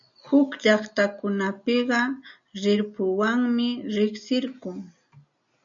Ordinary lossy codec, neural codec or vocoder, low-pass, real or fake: MP3, 96 kbps; none; 7.2 kHz; real